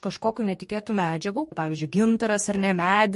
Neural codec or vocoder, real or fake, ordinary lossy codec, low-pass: codec, 44.1 kHz, 2.6 kbps, DAC; fake; MP3, 48 kbps; 14.4 kHz